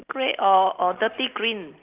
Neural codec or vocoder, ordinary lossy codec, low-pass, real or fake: none; Opus, 32 kbps; 3.6 kHz; real